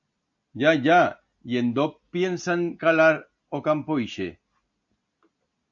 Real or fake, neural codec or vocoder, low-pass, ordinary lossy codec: real; none; 7.2 kHz; AAC, 64 kbps